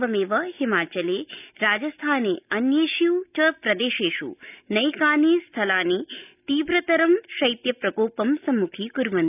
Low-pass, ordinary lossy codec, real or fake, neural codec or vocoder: 3.6 kHz; none; real; none